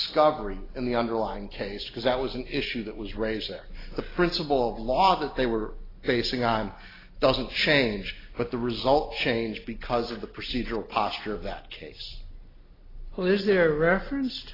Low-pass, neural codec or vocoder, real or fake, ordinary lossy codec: 5.4 kHz; none; real; AAC, 24 kbps